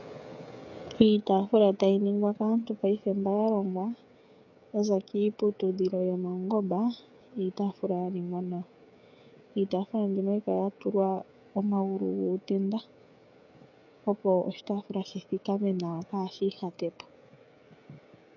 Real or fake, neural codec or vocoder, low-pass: fake; codec, 16 kHz, 16 kbps, FreqCodec, smaller model; 7.2 kHz